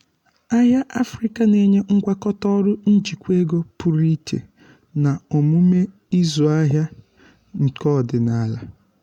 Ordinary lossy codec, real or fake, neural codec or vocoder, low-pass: MP3, 96 kbps; real; none; 19.8 kHz